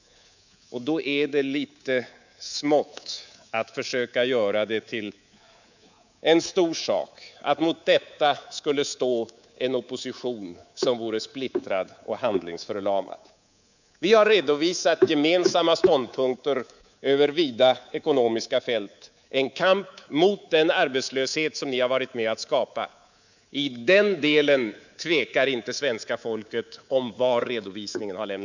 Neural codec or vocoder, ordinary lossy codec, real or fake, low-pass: codec, 24 kHz, 3.1 kbps, DualCodec; none; fake; 7.2 kHz